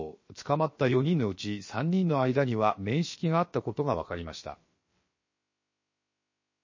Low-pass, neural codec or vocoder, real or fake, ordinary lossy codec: 7.2 kHz; codec, 16 kHz, about 1 kbps, DyCAST, with the encoder's durations; fake; MP3, 32 kbps